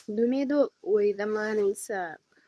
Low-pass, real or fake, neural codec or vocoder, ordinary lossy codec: none; fake; codec, 24 kHz, 0.9 kbps, WavTokenizer, medium speech release version 2; none